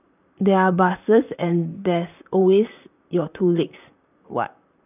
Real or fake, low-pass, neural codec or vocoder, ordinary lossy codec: fake; 3.6 kHz; vocoder, 44.1 kHz, 128 mel bands, Pupu-Vocoder; none